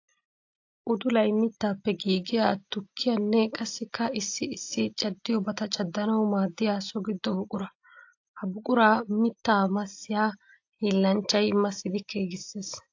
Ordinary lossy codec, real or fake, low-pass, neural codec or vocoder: AAC, 48 kbps; real; 7.2 kHz; none